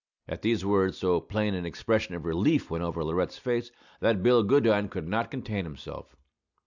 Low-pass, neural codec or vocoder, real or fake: 7.2 kHz; none; real